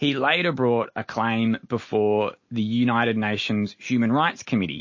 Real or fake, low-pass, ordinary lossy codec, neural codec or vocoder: real; 7.2 kHz; MP3, 32 kbps; none